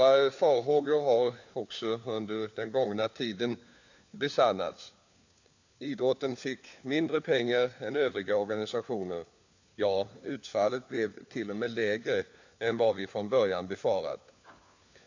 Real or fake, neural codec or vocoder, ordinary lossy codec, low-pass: fake; codec, 16 kHz, 4 kbps, FunCodec, trained on LibriTTS, 50 frames a second; AAC, 48 kbps; 7.2 kHz